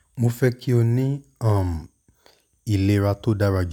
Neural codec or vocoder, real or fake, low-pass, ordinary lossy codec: none; real; 19.8 kHz; none